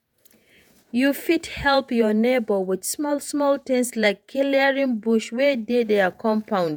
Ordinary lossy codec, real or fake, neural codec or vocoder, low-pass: none; fake; vocoder, 48 kHz, 128 mel bands, Vocos; none